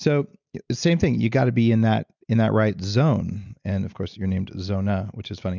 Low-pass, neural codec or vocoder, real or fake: 7.2 kHz; none; real